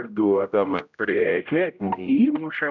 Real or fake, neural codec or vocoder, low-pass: fake; codec, 16 kHz, 0.5 kbps, X-Codec, HuBERT features, trained on general audio; 7.2 kHz